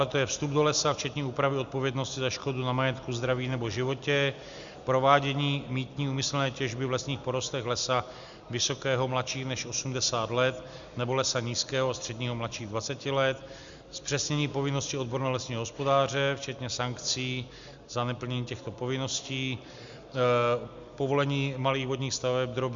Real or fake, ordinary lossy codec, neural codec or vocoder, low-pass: real; Opus, 64 kbps; none; 7.2 kHz